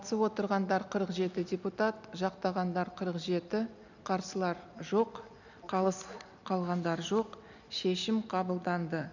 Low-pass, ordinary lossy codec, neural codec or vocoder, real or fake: 7.2 kHz; none; none; real